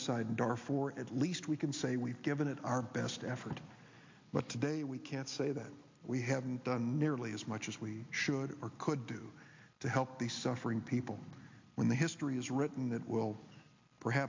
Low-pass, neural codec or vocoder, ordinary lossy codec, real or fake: 7.2 kHz; none; MP3, 48 kbps; real